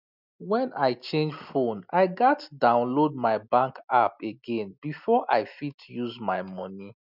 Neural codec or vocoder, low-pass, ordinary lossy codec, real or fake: none; 5.4 kHz; none; real